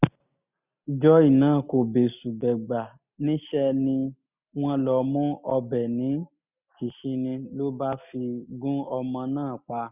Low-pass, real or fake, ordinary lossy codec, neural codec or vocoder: 3.6 kHz; real; none; none